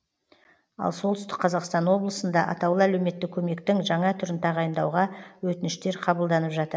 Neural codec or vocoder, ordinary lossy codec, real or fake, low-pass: none; none; real; none